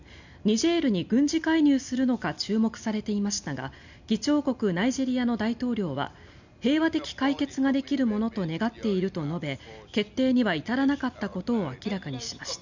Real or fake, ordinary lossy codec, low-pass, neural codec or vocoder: real; none; 7.2 kHz; none